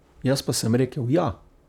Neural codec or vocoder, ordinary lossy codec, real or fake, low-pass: vocoder, 44.1 kHz, 128 mel bands, Pupu-Vocoder; none; fake; 19.8 kHz